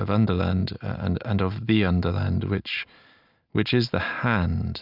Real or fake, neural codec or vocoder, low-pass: real; none; 5.4 kHz